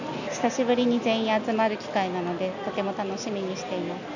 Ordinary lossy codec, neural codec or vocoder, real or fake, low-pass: none; none; real; 7.2 kHz